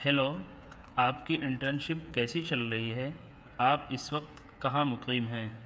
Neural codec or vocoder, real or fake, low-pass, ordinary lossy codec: codec, 16 kHz, 8 kbps, FreqCodec, smaller model; fake; none; none